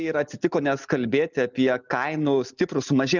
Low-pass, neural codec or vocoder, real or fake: 7.2 kHz; none; real